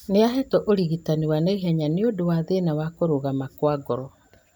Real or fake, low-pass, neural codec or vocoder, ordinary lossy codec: real; none; none; none